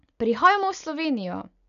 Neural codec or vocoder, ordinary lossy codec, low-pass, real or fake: none; none; 7.2 kHz; real